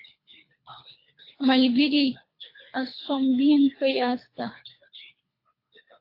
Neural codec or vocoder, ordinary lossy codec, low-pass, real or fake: codec, 24 kHz, 3 kbps, HILCodec; AAC, 32 kbps; 5.4 kHz; fake